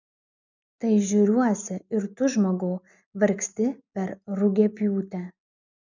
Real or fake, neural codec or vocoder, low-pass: real; none; 7.2 kHz